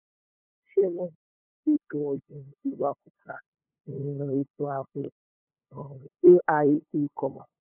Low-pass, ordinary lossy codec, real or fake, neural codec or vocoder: 3.6 kHz; none; fake; codec, 16 kHz, 2 kbps, FunCodec, trained on LibriTTS, 25 frames a second